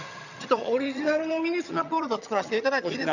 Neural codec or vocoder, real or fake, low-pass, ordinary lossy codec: vocoder, 22.05 kHz, 80 mel bands, HiFi-GAN; fake; 7.2 kHz; none